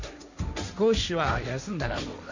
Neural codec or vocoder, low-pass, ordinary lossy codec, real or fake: codec, 16 kHz, 1.1 kbps, Voila-Tokenizer; 7.2 kHz; none; fake